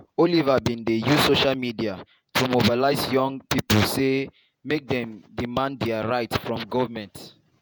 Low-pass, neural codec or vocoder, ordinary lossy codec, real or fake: none; vocoder, 48 kHz, 128 mel bands, Vocos; none; fake